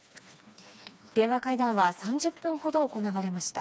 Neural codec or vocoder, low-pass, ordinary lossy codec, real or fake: codec, 16 kHz, 2 kbps, FreqCodec, smaller model; none; none; fake